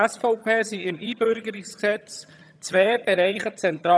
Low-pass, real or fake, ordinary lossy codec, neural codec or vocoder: none; fake; none; vocoder, 22.05 kHz, 80 mel bands, HiFi-GAN